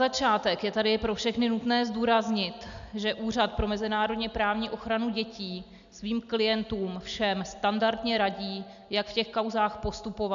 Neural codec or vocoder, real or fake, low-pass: none; real; 7.2 kHz